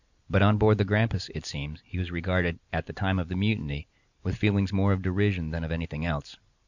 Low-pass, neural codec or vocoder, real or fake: 7.2 kHz; none; real